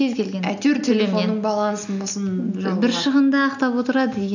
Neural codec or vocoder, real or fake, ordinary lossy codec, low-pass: none; real; none; 7.2 kHz